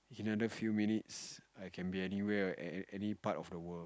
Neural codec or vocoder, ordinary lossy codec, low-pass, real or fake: none; none; none; real